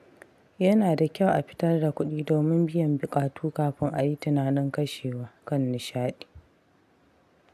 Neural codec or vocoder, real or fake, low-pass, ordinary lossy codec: none; real; 14.4 kHz; AAC, 96 kbps